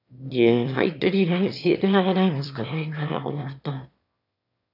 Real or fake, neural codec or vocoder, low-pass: fake; autoencoder, 22.05 kHz, a latent of 192 numbers a frame, VITS, trained on one speaker; 5.4 kHz